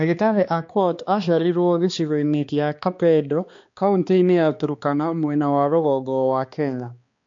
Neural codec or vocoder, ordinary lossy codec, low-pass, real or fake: codec, 16 kHz, 2 kbps, X-Codec, HuBERT features, trained on balanced general audio; MP3, 48 kbps; 7.2 kHz; fake